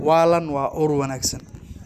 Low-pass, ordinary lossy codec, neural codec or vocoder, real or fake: 14.4 kHz; none; none; real